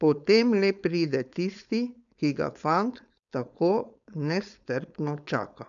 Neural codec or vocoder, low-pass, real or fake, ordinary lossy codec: codec, 16 kHz, 4.8 kbps, FACodec; 7.2 kHz; fake; none